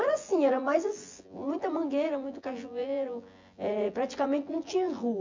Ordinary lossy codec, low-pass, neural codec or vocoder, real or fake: MP3, 64 kbps; 7.2 kHz; vocoder, 24 kHz, 100 mel bands, Vocos; fake